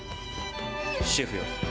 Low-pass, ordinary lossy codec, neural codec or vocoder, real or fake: none; none; none; real